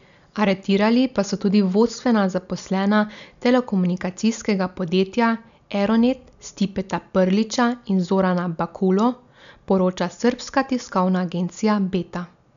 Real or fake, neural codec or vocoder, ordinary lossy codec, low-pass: real; none; none; 7.2 kHz